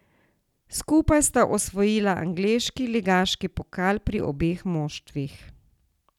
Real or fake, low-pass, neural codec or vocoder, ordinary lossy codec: real; 19.8 kHz; none; none